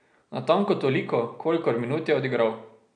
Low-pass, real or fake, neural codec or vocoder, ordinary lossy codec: 9.9 kHz; real; none; none